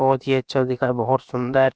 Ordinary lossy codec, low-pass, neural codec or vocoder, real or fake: none; none; codec, 16 kHz, about 1 kbps, DyCAST, with the encoder's durations; fake